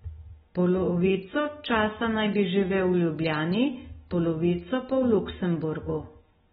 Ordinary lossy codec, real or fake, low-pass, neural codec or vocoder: AAC, 16 kbps; real; 19.8 kHz; none